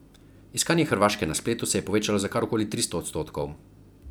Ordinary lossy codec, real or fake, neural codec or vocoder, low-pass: none; fake; vocoder, 44.1 kHz, 128 mel bands every 256 samples, BigVGAN v2; none